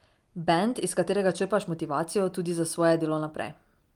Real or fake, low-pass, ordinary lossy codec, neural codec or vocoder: real; 19.8 kHz; Opus, 32 kbps; none